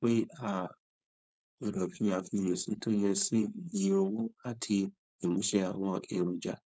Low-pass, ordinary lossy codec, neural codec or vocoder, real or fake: none; none; codec, 16 kHz, 4.8 kbps, FACodec; fake